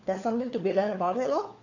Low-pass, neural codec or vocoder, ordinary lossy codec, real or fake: 7.2 kHz; codec, 16 kHz, 4 kbps, FunCodec, trained on LibriTTS, 50 frames a second; none; fake